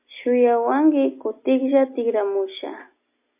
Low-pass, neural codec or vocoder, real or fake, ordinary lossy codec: 3.6 kHz; none; real; MP3, 24 kbps